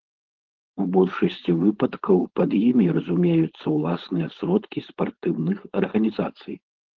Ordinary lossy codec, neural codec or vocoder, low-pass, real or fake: Opus, 16 kbps; codec, 16 kHz, 4.8 kbps, FACodec; 7.2 kHz; fake